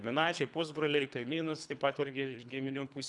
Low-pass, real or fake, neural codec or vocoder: 10.8 kHz; fake; codec, 24 kHz, 3 kbps, HILCodec